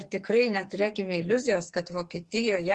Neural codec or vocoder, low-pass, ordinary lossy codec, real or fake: codec, 44.1 kHz, 2.6 kbps, SNAC; 10.8 kHz; Opus, 24 kbps; fake